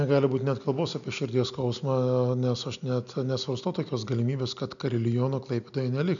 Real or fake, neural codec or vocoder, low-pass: real; none; 7.2 kHz